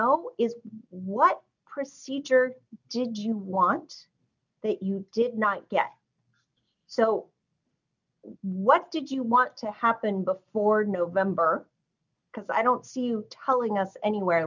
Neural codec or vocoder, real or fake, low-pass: none; real; 7.2 kHz